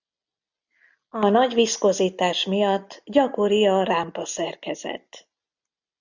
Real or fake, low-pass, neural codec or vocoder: real; 7.2 kHz; none